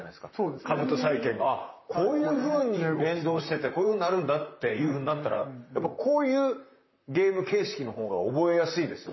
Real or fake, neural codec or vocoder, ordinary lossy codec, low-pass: fake; vocoder, 44.1 kHz, 128 mel bands, Pupu-Vocoder; MP3, 24 kbps; 7.2 kHz